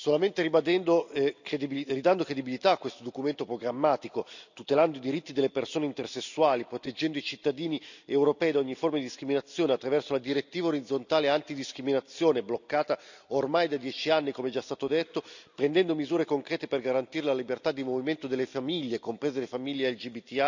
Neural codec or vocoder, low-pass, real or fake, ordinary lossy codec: none; 7.2 kHz; real; none